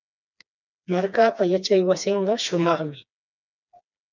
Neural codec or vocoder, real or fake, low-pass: codec, 16 kHz, 2 kbps, FreqCodec, smaller model; fake; 7.2 kHz